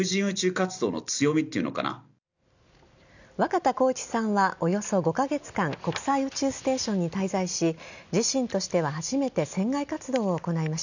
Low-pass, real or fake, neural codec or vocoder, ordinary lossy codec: 7.2 kHz; real; none; none